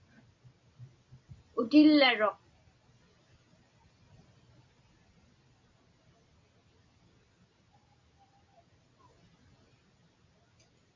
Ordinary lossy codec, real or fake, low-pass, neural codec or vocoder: MP3, 32 kbps; real; 7.2 kHz; none